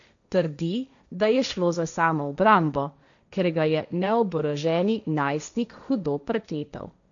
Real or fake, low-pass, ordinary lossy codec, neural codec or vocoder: fake; 7.2 kHz; none; codec, 16 kHz, 1.1 kbps, Voila-Tokenizer